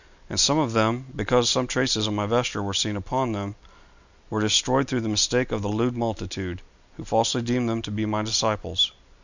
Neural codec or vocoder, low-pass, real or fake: none; 7.2 kHz; real